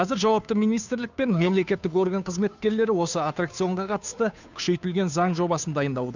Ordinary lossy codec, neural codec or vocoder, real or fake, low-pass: none; codec, 16 kHz, 2 kbps, FunCodec, trained on Chinese and English, 25 frames a second; fake; 7.2 kHz